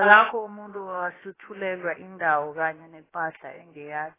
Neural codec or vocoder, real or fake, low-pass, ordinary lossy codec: codec, 16 kHz, about 1 kbps, DyCAST, with the encoder's durations; fake; 3.6 kHz; AAC, 16 kbps